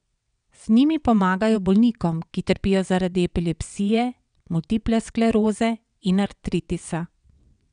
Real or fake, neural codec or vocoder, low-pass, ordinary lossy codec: fake; vocoder, 22.05 kHz, 80 mel bands, WaveNeXt; 9.9 kHz; none